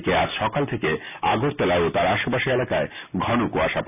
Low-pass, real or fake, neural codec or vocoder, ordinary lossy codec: 3.6 kHz; real; none; none